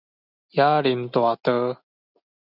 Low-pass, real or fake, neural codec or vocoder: 5.4 kHz; real; none